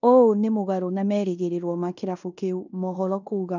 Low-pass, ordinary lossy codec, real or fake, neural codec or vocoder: 7.2 kHz; none; fake; codec, 16 kHz in and 24 kHz out, 0.9 kbps, LongCat-Audio-Codec, fine tuned four codebook decoder